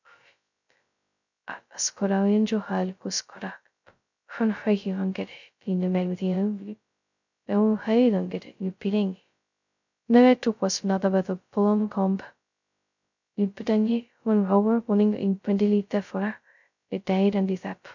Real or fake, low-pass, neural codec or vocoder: fake; 7.2 kHz; codec, 16 kHz, 0.2 kbps, FocalCodec